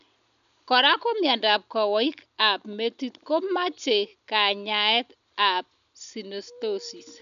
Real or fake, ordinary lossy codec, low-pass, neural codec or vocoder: real; none; 7.2 kHz; none